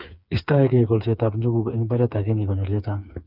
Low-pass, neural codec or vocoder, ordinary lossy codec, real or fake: 5.4 kHz; codec, 16 kHz, 4 kbps, FreqCodec, smaller model; none; fake